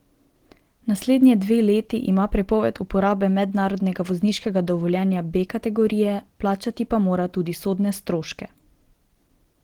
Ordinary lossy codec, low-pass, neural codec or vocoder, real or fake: Opus, 16 kbps; 19.8 kHz; none; real